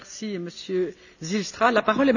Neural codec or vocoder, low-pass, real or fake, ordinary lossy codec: none; 7.2 kHz; real; none